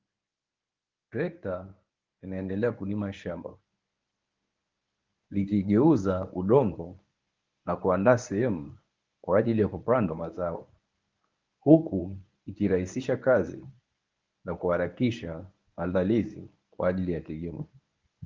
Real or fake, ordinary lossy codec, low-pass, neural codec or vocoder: fake; Opus, 32 kbps; 7.2 kHz; codec, 24 kHz, 0.9 kbps, WavTokenizer, medium speech release version 1